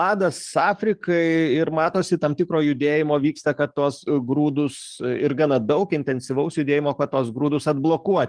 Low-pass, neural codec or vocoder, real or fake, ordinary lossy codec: 9.9 kHz; codec, 44.1 kHz, 7.8 kbps, DAC; fake; Opus, 32 kbps